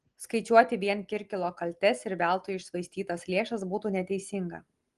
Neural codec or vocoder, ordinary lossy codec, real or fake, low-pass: none; Opus, 24 kbps; real; 10.8 kHz